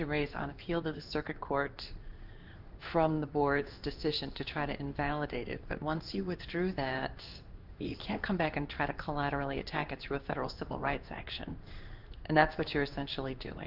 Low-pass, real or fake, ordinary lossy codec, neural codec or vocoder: 5.4 kHz; fake; Opus, 24 kbps; codec, 16 kHz in and 24 kHz out, 1 kbps, XY-Tokenizer